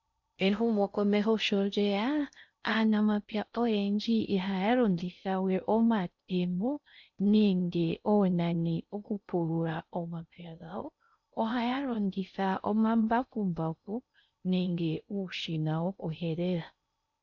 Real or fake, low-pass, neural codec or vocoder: fake; 7.2 kHz; codec, 16 kHz in and 24 kHz out, 0.6 kbps, FocalCodec, streaming, 4096 codes